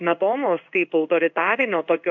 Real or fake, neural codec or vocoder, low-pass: fake; codec, 16 kHz in and 24 kHz out, 1 kbps, XY-Tokenizer; 7.2 kHz